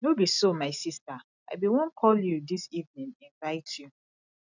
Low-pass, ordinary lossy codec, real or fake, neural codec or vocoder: 7.2 kHz; none; real; none